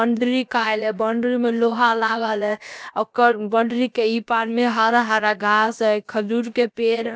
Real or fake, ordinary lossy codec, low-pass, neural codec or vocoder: fake; none; none; codec, 16 kHz, about 1 kbps, DyCAST, with the encoder's durations